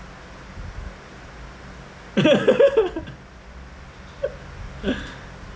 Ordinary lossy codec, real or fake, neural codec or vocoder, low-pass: none; real; none; none